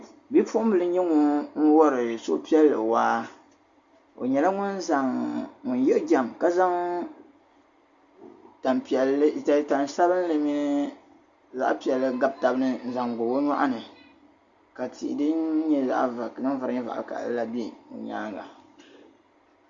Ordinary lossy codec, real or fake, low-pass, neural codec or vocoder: Opus, 64 kbps; real; 7.2 kHz; none